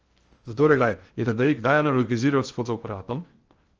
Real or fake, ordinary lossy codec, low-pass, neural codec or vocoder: fake; Opus, 24 kbps; 7.2 kHz; codec, 16 kHz in and 24 kHz out, 0.6 kbps, FocalCodec, streaming, 2048 codes